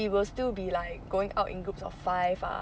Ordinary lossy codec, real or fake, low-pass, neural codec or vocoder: none; real; none; none